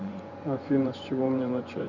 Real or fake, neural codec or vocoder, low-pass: fake; vocoder, 24 kHz, 100 mel bands, Vocos; 7.2 kHz